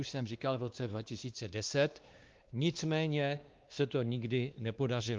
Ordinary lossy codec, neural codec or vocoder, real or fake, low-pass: Opus, 32 kbps; codec, 16 kHz, 2 kbps, X-Codec, WavLM features, trained on Multilingual LibriSpeech; fake; 7.2 kHz